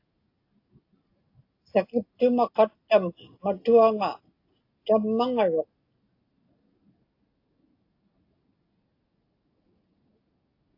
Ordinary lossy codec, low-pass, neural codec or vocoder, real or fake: AAC, 32 kbps; 5.4 kHz; none; real